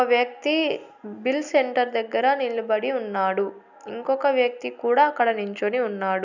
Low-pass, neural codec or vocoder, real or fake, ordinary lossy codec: 7.2 kHz; none; real; none